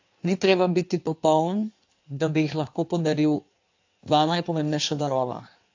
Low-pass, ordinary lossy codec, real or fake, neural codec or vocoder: 7.2 kHz; AAC, 48 kbps; fake; codec, 16 kHz in and 24 kHz out, 1.1 kbps, FireRedTTS-2 codec